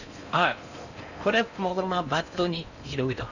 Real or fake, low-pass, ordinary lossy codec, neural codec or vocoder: fake; 7.2 kHz; none; codec, 16 kHz in and 24 kHz out, 0.6 kbps, FocalCodec, streaming, 4096 codes